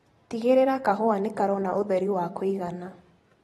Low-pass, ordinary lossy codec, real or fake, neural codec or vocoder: 19.8 kHz; AAC, 32 kbps; fake; vocoder, 44.1 kHz, 128 mel bands every 512 samples, BigVGAN v2